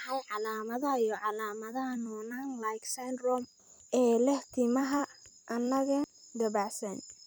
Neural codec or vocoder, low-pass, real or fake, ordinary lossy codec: vocoder, 44.1 kHz, 128 mel bands every 256 samples, BigVGAN v2; none; fake; none